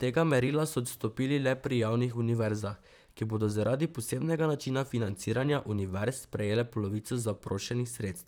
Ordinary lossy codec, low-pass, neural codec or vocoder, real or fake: none; none; vocoder, 44.1 kHz, 128 mel bands, Pupu-Vocoder; fake